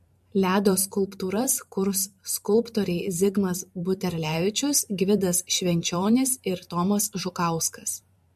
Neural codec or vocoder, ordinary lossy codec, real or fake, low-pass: vocoder, 44.1 kHz, 128 mel bands every 512 samples, BigVGAN v2; MP3, 64 kbps; fake; 14.4 kHz